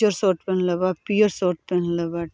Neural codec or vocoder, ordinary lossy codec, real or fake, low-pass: none; none; real; none